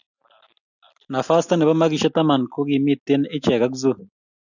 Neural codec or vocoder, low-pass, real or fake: none; 7.2 kHz; real